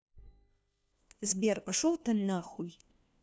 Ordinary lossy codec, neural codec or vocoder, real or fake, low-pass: none; codec, 16 kHz, 1 kbps, FunCodec, trained on LibriTTS, 50 frames a second; fake; none